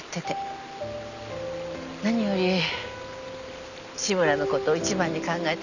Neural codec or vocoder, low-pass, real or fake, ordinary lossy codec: none; 7.2 kHz; real; none